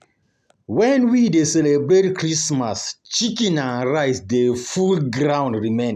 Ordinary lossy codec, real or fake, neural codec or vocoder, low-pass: none; real; none; 14.4 kHz